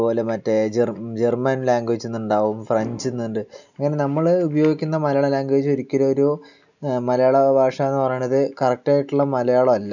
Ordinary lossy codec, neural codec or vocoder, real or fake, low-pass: none; none; real; 7.2 kHz